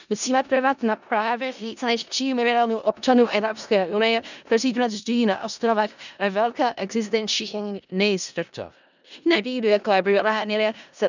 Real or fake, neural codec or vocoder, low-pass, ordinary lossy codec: fake; codec, 16 kHz in and 24 kHz out, 0.4 kbps, LongCat-Audio-Codec, four codebook decoder; 7.2 kHz; none